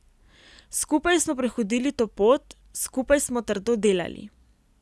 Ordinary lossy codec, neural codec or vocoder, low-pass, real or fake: none; none; none; real